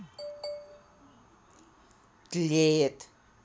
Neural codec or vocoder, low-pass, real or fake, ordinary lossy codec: none; none; real; none